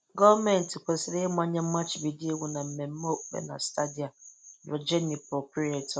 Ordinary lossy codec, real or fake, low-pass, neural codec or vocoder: none; real; none; none